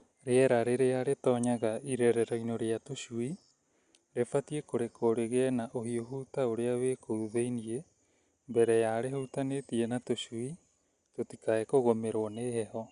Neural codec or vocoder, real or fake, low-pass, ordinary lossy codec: none; real; 9.9 kHz; Opus, 64 kbps